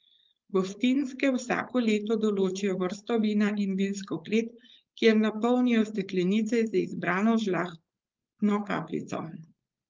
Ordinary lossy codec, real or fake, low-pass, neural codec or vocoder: Opus, 24 kbps; fake; 7.2 kHz; codec, 16 kHz, 4.8 kbps, FACodec